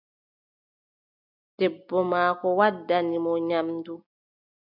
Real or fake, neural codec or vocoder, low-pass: real; none; 5.4 kHz